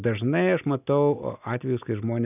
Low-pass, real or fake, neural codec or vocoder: 3.6 kHz; real; none